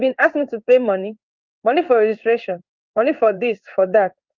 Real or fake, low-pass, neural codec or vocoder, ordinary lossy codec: fake; 7.2 kHz; codec, 16 kHz in and 24 kHz out, 1 kbps, XY-Tokenizer; Opus, 24 kbps